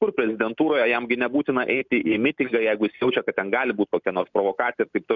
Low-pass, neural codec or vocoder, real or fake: 7.2 kHz; none; real